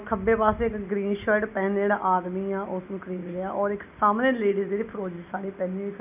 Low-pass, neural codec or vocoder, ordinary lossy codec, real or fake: 3.6 kHz; none; none; real